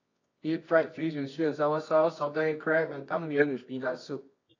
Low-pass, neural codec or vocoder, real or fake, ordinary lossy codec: 7.2 kHz; codec, 24 kHz, 0.9 kbps, WavTokenizer, medium music audio release; fake; AAC, 32 kbps